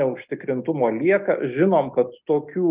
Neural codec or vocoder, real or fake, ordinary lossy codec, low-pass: none; real; Opus, 32 kbps; 3.6 kHz